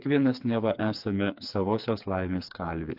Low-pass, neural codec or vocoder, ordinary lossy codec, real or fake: 5.4 kHz; codec, 16 kHz, 4 kbps, FreqCodec, smaller model; AAC, 48 kbps; fake